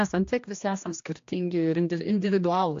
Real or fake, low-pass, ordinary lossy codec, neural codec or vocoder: fake; 7.2 kHz; AAC, 48 kbps; codec, 16 kHz, 1 kbps, X-Codec, HuBERT features, trained on general audio